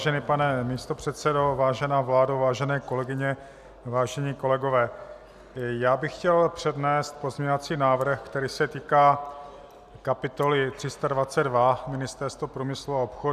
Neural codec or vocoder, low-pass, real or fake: none; 14.4 kHz; real